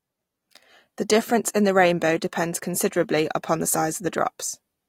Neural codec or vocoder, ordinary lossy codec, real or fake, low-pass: none; AAC, 48 kbps; real; 19.8 kHz